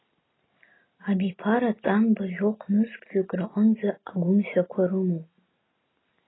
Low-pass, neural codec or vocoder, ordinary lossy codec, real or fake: 7.2 kHz; none; AAC, 16 kbps; real